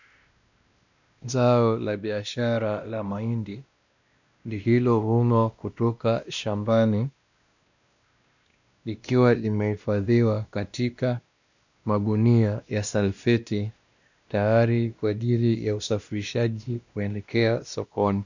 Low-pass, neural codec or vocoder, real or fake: 7.2 kHz; codec, 16 kHz, 1 kbps, X-Codec, WavLM features, trained on Multilingual LibriSpeech; fake